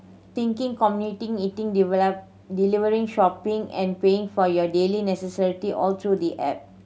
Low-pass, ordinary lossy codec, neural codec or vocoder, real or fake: none; none; none; real